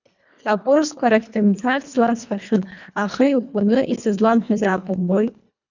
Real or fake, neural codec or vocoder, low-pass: fake; codec, 24 kHz, 1.5 kbps, HILCodec; 7.2 kHz